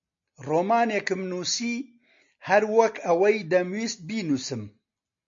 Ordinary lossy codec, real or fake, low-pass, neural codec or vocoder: MP3, 48 kbps; real; 7.2 kHz; none